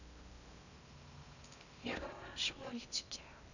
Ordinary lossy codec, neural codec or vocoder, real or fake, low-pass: none; codec, 16 kHz in and 24 kHz out, 0.6 kbps, FocalCodec, streaming, 2048 codes; fake; 7.2 kHz